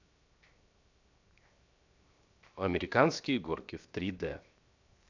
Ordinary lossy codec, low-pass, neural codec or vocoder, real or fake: none; 7.2 kHz; codec, 16 kHz, 0.7 kbps, FocalCodec; fake